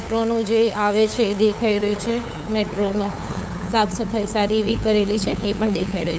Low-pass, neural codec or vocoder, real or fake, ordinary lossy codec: none; codec, 16 kHz, 8 kbps, FunCodec, trained on LibriTTS, 25 frames a second; fake; none